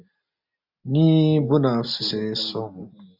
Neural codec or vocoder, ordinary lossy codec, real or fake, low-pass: none; AAC, 48 kbps; real; 5.4 kHz